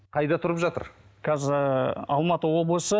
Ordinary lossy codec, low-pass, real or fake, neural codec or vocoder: none; none; real; none